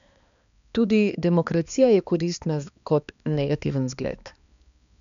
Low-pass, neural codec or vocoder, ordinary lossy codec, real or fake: 7.2 kHz; codec, 16 kHz, 2 kbps, X-Codec, HuBERT features, trained on balanced general audio; MP3, 96 kbps; fake